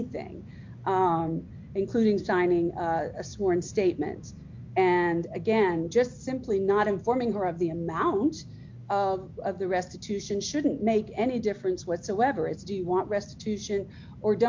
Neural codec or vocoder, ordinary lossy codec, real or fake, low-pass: none; MP3, 48 kbps; real; 7.2 kHz